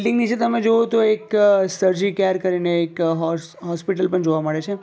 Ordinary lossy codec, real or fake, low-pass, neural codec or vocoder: none; real; none; none